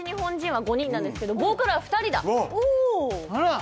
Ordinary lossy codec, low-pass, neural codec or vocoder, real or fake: none; none; none; real